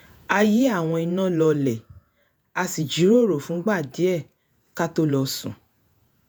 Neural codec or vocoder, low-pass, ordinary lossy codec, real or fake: vocoder, 48 kHz, 128 mel bands, Vocos; none; none; fake